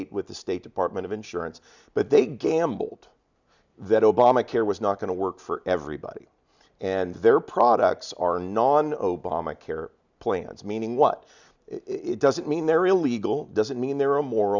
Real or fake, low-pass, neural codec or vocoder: real; 7.2 kHz; none